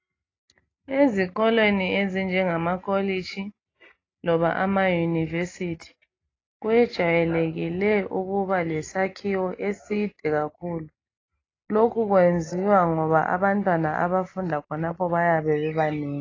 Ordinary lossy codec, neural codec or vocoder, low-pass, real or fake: AAC, 32 kbps; none; 7.2 kHz; real